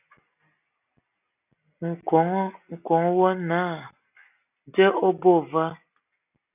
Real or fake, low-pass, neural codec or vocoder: real; 3.6 kHz; none